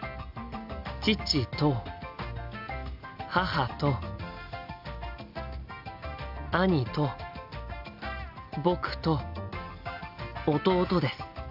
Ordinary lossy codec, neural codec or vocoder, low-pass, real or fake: AAC, 48 kbps; none; 5.4 kHz; real